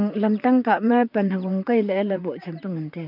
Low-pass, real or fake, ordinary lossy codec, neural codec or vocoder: 5.4 kHz; fake; none; vocoder, 44.1 kHz, 128 mel bands, Pupu-Vocoder